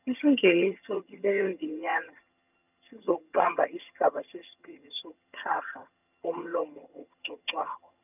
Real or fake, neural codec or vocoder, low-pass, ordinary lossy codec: fake; vocoder, 22.05 kHz, 80 mel bands, HiFi-GAN; 3.6 kHz; none